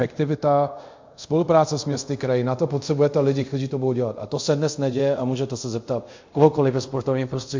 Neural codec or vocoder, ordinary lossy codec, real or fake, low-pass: codec, 24 kHz, 0.5 kbps, DualCodec; MP3, 48 kbps; fake; 7.2 kHz